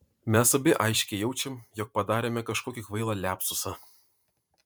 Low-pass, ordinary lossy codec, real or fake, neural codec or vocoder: 19.8 kHz; MP3, 96 kbps; fake; vocoder, 44.1 kHz, 128 mel bands every 512 samples, BigVGAN v2